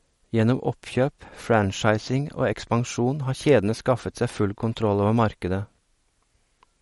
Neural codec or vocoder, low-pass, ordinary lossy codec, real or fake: none; 19.8 kHz; MP3, 48 kbps; real